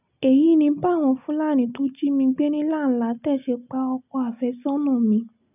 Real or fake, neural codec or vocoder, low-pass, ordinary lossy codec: real; none; 3.6 kHz; none